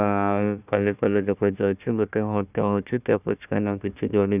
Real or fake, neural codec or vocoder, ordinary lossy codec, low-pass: fake; codec, 16 kHz, 1 kbps, FunCodec, trained on Chinese and English, 50 frames a second; none; 3.6 kHz